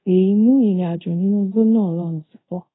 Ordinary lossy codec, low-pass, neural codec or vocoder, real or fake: AAC, 16 kbps; 7.2 kHz; codec, 24 kHz, 0.5 kbps, DualCodec; fake